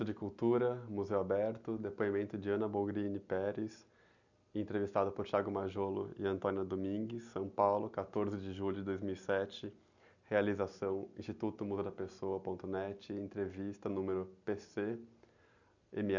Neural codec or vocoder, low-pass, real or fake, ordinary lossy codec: none; 7.2 kHz; real; none